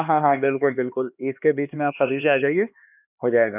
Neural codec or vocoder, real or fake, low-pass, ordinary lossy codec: codec, 16 kHz, 2 kbps, X-Codec, HuBERT features, trained on LibriSpeech; fake; 3.6 kHz; none